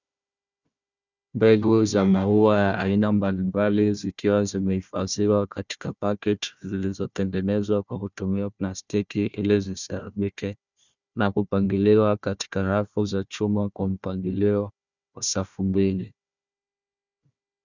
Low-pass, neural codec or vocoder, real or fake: 7.2 kHz; codec, 16 kHz, 1 kbps, FunCodec, trained on Chinese and English, 50 frames a second; fake